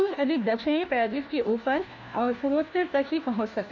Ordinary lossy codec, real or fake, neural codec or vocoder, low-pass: none; fake; codec, 16 kHz, 1 kbps, FunCodec, trained on LibriTTS, 50 frames a second; 7.2 kHz